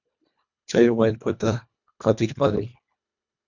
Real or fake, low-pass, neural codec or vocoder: fake; 7.2 kHz; codec, 24 kHz, 1.5 kbps, HILCodec